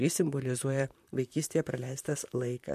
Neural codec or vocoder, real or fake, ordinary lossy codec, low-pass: vocoder, 44.1 kHz, 128 mel bands, Pupu-Vocoder; fake; MP3, 64 kbps; 14.4 kHz